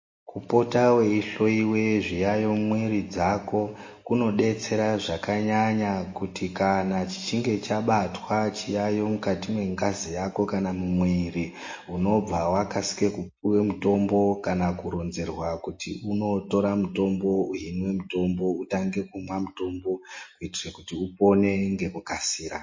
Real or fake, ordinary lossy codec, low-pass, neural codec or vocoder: real; MP3, 32 kbps; 7.2 kHz; none